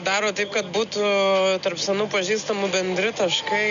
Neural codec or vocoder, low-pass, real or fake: none; 7.2 kHz; real